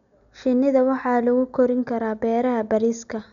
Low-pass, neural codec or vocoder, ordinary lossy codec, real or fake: 7.2 kHz; none; none; real